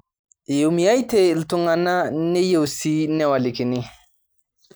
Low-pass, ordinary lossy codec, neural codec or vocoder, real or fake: none; none; none; real